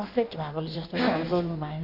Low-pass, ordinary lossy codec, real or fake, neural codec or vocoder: 5.4 kHz; none; fake; codec, 16 kHz in and 24 kHz out, 1.1 kbps, FireRedTTS-2 codec